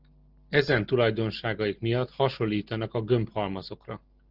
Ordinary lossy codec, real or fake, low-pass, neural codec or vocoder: Opus, 16 kbps; real; 5.4 kHz; none